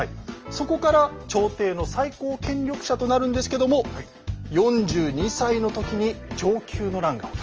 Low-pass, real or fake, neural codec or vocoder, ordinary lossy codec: 7.2 kHz; real; none; Opus, 24 kbps